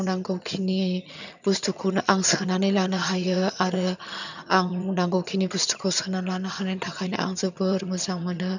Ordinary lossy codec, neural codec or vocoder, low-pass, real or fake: none; vocoder, 22.05 kHz, 80 mel bands, HiFi-GAN; 7.2 kHz; fake